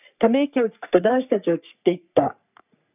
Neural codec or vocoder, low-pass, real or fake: codec, 44.1 kHz, 3.4 kbps, Pupu-Codec; 3.6 kHz; fake